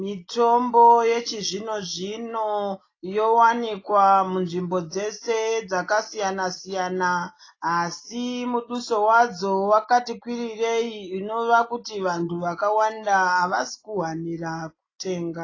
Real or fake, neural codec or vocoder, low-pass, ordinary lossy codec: real; none; 7.2 kHz; AAC, 32 kbps